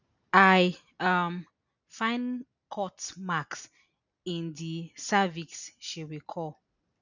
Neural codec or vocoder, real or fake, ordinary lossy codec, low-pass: none; real; none; 7.2 kHz